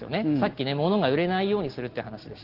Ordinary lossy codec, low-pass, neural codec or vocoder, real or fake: Opus, 16 kbps; 5.4 kHz; none; real